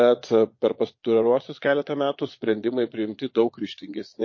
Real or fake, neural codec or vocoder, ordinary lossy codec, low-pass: fake; vocoder, 22.05 kHz, 80 mel bands, Vocos; MP3, 32 kbps; 7.2 kHz